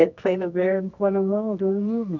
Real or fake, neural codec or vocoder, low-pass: fake; codec, 24 kHz, 0.9 kbps, WavTokenizer, medium music audio release; 7.2 kHz